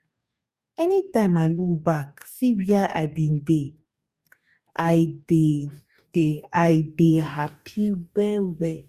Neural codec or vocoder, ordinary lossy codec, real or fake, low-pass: codec, 44.1 kHz, 2.6 kbps, DAC; Opus, 64 kbps; fake; 14.4 kHz